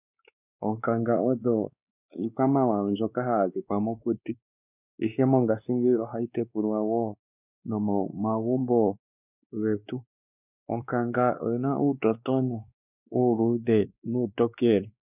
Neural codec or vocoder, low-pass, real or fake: codec, 16 kHz, 2 kbps, X-Codec, WavLM features, trained on Multilingual LibriSpeech; 3.6 kHz; fake